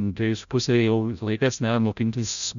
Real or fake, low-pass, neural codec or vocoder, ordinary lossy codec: fake; 7.2 kHz; codec, 16 kHz, 0.5 kbps, FreqCodec, larger model; MP3, 96 kbps